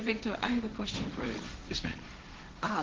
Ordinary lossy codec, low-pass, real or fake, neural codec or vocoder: Opus, 16 kbps; 7.2 kHz; fake; codec, 16 kHz, 1.1 kbps, Voila-Tokenizer